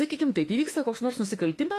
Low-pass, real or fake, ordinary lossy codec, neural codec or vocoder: 14.4 kHz; fake; AAC, 48 kbps; autoencoder, 48 kHz, 32 numbers a frame, DAC-VAE, trained on Japanese speech